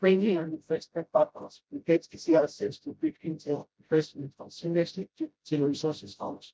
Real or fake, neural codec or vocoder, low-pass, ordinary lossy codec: fake; codec, 16 kHz, 0.5 kbps, FreqCodec, smaller model; none; none